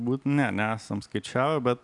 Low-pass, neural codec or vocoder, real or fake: 10.8 kHz; none; real